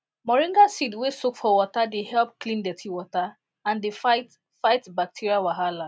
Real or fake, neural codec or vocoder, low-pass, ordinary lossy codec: real; none; none; none